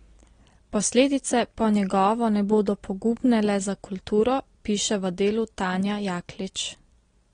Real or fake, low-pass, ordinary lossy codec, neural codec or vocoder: real; 9.9 kHz; AAC, 32 kbps; none